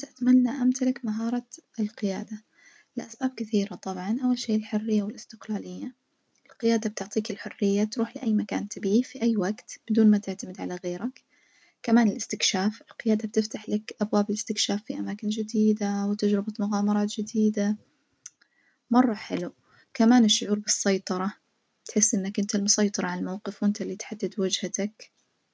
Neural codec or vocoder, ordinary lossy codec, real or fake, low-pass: none; none; real; none